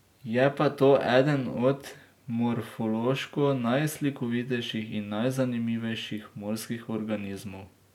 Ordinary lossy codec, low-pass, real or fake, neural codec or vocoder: MP3, 96 kbps; 19.8 kHz; real; none